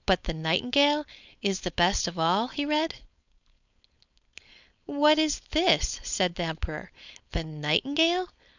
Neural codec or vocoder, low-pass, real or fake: codec, 16 kHz, 4.8 kbps, FACodec; 7.2 kHz; fake